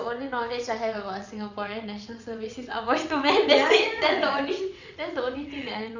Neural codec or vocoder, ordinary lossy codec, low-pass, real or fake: vocoder, 22.05 kHz, 80 mel bands, WaveNeXt; none; 7.2 kHz; fake